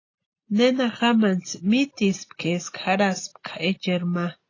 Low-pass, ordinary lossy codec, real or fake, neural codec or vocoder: 7.2 kHz; AAC, 32 kbps; real; none